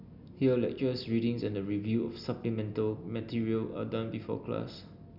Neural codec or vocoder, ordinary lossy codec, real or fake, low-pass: none; none; real; 5.4 kHz